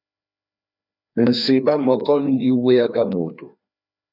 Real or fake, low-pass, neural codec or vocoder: fake; 5.4 kHz; codec, 16 kHz, 2 kbps, FreqCodec, larger model